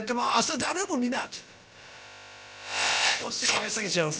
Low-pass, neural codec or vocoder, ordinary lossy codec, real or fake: none; codec, 16 kHz, about 1 kbps, DyCAST, with the encoder's durations; none; fake